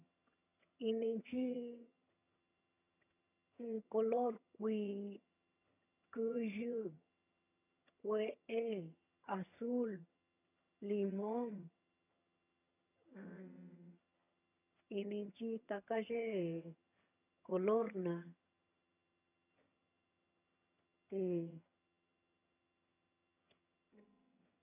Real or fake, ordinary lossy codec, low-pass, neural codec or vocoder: fake; none; 3.6 kHz; vocoder, 22.05 kHz, 80 mel bands, HiFi-GAN